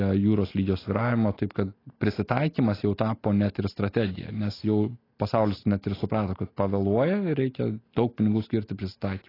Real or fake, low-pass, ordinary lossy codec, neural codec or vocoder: real; 5.4 kHz; AAC, 24 kbps; none